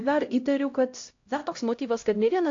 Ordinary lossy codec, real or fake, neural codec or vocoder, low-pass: AAC, 48 kbps; fake; codec, 16 kHz, 0.5 kbps, X-Codec, HuBERT features, trained on LibriSpeech; 7.2 kHz